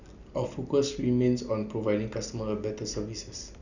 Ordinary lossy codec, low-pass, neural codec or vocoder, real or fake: none; 7.2 kHz; none; real